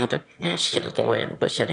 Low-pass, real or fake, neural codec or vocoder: 9.9 kHz; fake; autoencoder, 22.05 kHz, a latent of 192 numbers a frame, VITS, trained on one speaker